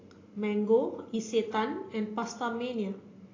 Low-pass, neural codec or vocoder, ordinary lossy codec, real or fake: 7.2 kHz; none; AAC, 32 kbps; real